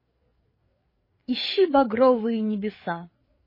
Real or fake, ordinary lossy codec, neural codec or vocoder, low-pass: fake; MP3, 24 kbps; codec, 16 kHz, 4 kbps, FreqCodec, larger model; 5.4 kHz